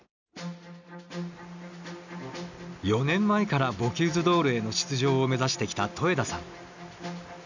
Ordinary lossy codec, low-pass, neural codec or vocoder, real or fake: none; 7.2 kHz; none; real